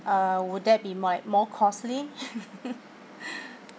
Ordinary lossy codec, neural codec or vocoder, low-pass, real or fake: none; none; none; real